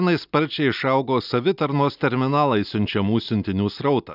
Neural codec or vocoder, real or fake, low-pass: none; real; 5.4 kHz